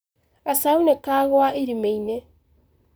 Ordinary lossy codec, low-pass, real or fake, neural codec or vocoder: none; none; fake; vocoder, 44.1 kHz, 128 mel bands, Pupu-Vocoder